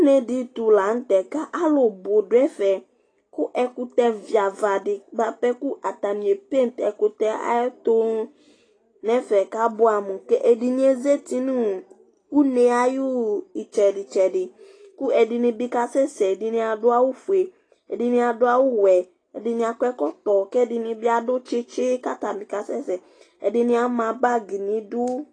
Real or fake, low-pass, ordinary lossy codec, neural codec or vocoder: real; 9.9 kHz; AAC, 32 kbps; none